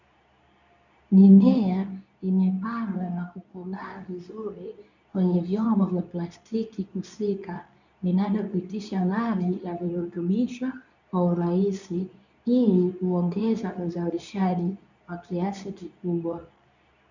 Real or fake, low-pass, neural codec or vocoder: fake; 7.2 kHz; codec, 24 kHz, 0.9 kbps, WavTokenizer, medium speech release version 2